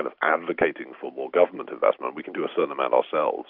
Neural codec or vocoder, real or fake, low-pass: none; real; 5.4 kHz